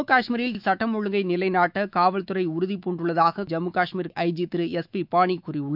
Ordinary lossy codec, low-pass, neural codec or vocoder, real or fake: none; 5.4 kHz; autoencoder, 48 kHz, 128 numbers a frame, DAC-VAE, trained on Japanese speech; fake